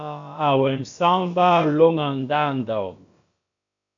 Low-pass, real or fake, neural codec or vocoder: 7.2 kHz; fake; codec, 16 kHz, about 1 kbps, DyCAST, with the encoder's durations